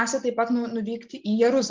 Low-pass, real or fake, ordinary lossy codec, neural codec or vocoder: 7.2 kHz; real; Opus, 32 kbps; none